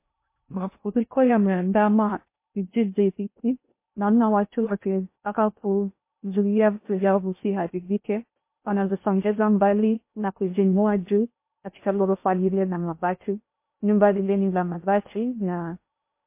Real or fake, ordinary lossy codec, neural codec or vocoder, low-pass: fake; MP3, 24 kbps; codec, 16 kHz in and 24 kHz out, 0.6 kbps, FocalCodec, streaming, 2048 codes; 3.6 kHz